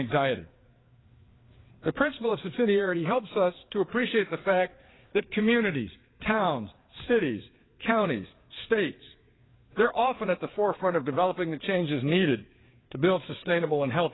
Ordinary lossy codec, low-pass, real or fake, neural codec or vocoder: AAC, 16 kbps; 7.2 kHz; fake; codec, 16 kHz, 4 kbps, FreqCodec, larger model